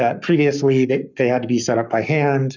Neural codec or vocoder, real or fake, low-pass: codec, 44.1 kHz, 7.8 kbps, Pupu-Codec; fake; 7.2 kHz